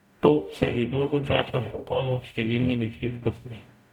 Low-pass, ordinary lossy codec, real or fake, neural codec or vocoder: 19.8 kHz; none; fake; codec, 44.1 kHz, 0.9 kbps, DAC